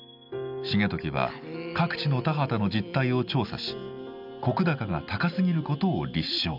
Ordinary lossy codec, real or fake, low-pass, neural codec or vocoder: none; real; 5.4 kHz; none